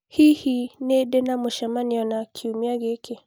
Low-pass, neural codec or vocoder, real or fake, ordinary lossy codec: none; none; real; none